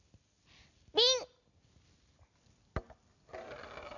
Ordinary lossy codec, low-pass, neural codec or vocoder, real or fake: none; 7.2 kHz; none; real